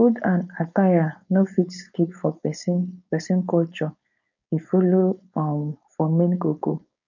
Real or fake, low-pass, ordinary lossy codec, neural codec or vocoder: fake; 7.2 kHz; none; codec, 16 kHz, 4.8 kbps, FACodec